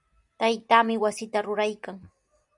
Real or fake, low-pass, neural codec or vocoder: real; 10.8 kHz; none